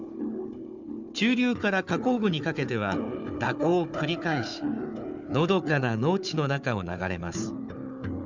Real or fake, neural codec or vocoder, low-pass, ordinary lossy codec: fake; codec, 16 kHz, 4 kbps, FunCodec, trained on Chinese and English, 50 frames a second; 7.2 kHz; none